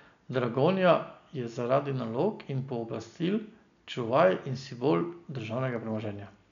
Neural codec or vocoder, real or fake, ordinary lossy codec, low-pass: none; real; none; 7.2 kHz